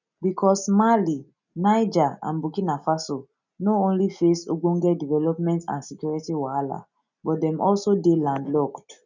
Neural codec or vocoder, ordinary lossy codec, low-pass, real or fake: none; none; 7.2 kHz; real